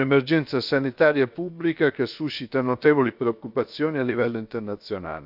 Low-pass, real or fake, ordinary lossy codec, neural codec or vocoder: 5.4 kHz; fake; none; codec, 16 kHz, about 1 kbps, DyCAST, with the encoder's durations